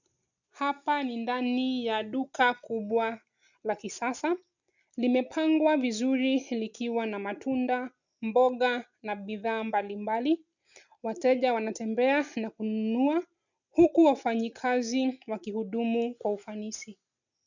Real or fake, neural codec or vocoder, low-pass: real; none; 7.2 kHz